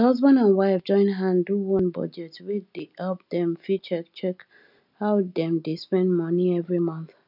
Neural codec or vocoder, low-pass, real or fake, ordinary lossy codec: none; 5.4 kHz; real; none